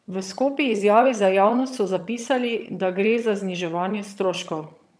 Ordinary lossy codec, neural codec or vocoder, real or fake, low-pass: none; vocoder, 22.05 kHz, 80 mel bands, HiFi-GAN; fake; none